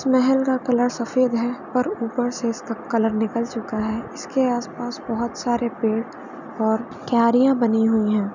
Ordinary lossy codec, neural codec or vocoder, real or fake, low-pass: none; none; real; 7.2 kHz